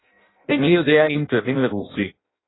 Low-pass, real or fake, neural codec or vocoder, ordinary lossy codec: 7.2 kHz; fake; codec, 16 kHz in and 24 kHz out, 0.6 kbps, FireRedTTS-2 codec; AAC, 16 kbps